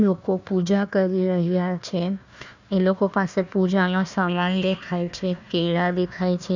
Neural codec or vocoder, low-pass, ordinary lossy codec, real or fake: codec, 16 kHz, 1 kbps, FunCodec, trained on Chinese and English, 50 frames a second; 7.2 kHz; none; fake